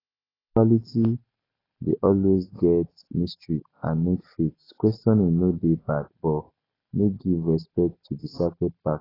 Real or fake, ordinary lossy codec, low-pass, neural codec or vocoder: real; AAC, 24 kbps; 5.4 kHz; none